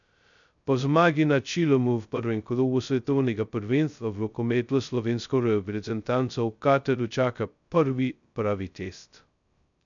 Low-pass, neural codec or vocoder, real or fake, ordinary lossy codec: 7.2 kHz; codec, 16 kHz, 0.2 kbps, FocalCodec; fake; none